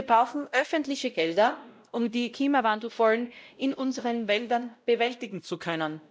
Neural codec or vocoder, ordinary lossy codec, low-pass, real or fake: codec, 16 kHz, 0.5 kbps, X-Codec, WavLM features, trained on Multilingual LibriSpeech; none; none; fake